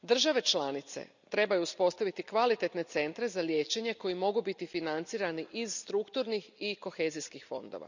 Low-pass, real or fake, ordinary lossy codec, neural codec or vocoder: 7.2 kHz; real; none; none